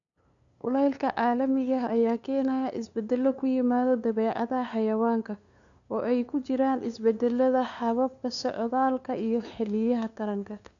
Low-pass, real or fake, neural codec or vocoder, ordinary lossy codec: 7.2 kHz; fake; codec, 16 kHz, 2 kbps, FunCodec, trained on LibriTTS, 25 frames a second; none